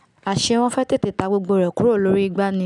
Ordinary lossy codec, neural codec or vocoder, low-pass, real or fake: none; none; 10.8 kHz; real